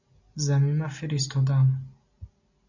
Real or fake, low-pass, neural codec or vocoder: real; 7.2 kHz; none